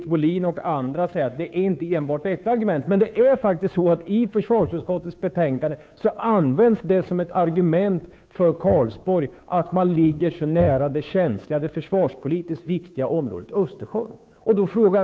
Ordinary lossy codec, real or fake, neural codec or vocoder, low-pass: none; fake; codec, 16 kHz, 2 kbps, FunCodec, trained on Chinese and English, 25 frames a second; none